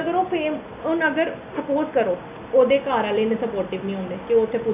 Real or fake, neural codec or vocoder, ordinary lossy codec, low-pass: real; none; none; 3.6 kHz